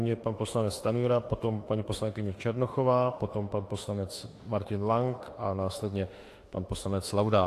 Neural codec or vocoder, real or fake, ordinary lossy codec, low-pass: autoencoder, 48 kHz, 32 numbers a frame, DAC-VAE, trained on Japanese speech; fake; AAC, 48 kbps; 14.4 kHz